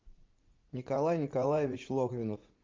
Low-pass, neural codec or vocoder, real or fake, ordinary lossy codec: 7.2 kHz; vocoder, 44.1 kHz, 80 mel bands, Vocos; fake; Opus, 24 kbps